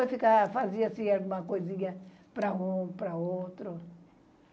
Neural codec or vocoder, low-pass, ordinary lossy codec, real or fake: none; none; none; real